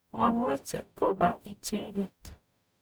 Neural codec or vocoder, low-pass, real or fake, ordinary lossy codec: codec, 44.1 kHz, 0.9 kbps, DAC; none; fake; none